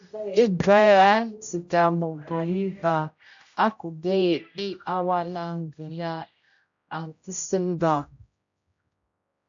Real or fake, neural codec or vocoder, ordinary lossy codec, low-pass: fake; codec, 16 kHz, 0.5 kbps, X-Codec, HuBERT features, trained on general audio; AAC, 64 kbps; 7.2 kHz